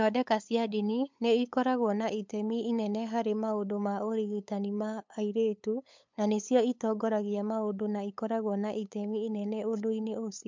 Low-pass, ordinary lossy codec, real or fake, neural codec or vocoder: 7.2 kHz; MP3, 64 kbps; fake; codec, 16 kHz, 8 kbps, FunCodec, trained on LibriTTS, 25 frames a second